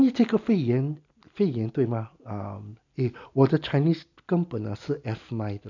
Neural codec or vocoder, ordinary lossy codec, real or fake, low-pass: none; none; real; 7.2 kHz